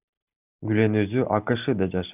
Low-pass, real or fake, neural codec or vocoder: 3.6 kHz; real; none